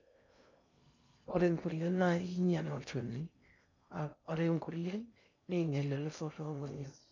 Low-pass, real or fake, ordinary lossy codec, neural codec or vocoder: 7.2 kHz; fake; none; codec, 16 kHz in and 24 kHz out, 0.6 kbps, FocalCodec, streaming, 2048 codes